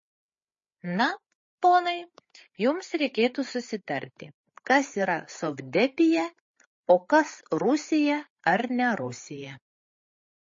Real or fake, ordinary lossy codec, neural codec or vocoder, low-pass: fake; MP3, 32 kbps; codec, 16 kHz, 8 kbps, FreqCodec, larger model; 7.2 kHz